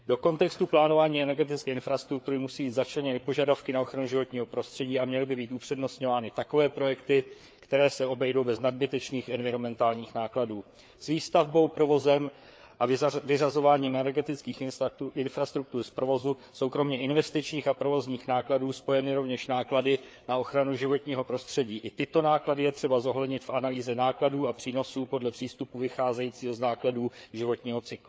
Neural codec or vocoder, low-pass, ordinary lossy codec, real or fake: codec, 16 kHz, 4 kbps, FreqCodec, larger model; none; none; fake